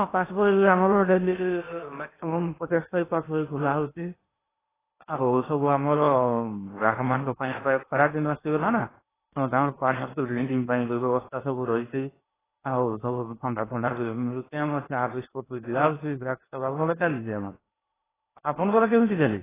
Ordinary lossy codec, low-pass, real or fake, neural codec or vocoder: AAC, 16 kbps; 3.6 kHz; fake; codec, 16 kHz in and 24 kHz out, 0.8 kbps, FocalCodec, streaming, 65536 codes